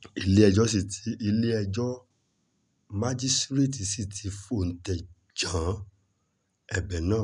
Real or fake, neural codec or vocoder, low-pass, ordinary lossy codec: real; none; 10.8 kHz; none